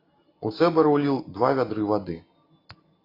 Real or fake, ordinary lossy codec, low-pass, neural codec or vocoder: real; AAC, 24 kbps; 5.4 kHz; none